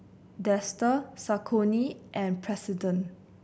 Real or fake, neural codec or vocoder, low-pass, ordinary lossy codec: real; none; none; none